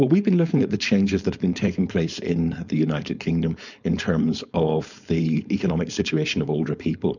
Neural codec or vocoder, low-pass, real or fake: codec, 16 kHz, 4.8 kbps, FACodec; 7.2 kHz; fake